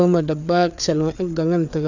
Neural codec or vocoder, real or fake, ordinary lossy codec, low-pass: codec, 16 kHz, 4 kbps, FreqCodec, larger model; fake; none; 7.2 kHz